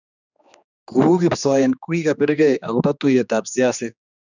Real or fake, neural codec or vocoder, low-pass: fake; codec, 16 kHz, 2 kbps, X-Codec, HuBERT features, trained on general audio; 7.2 kHz